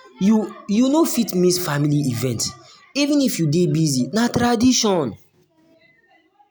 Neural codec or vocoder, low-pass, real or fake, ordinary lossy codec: none; none; real; none